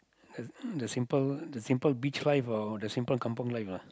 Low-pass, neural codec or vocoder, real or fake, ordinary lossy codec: none; none; real; none